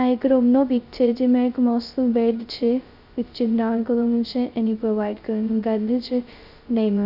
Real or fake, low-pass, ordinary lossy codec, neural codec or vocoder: fake; 5.4 kHz; Opus, 64 kbps; codec, 16 kHz, 0.2 kbps, FocalCodec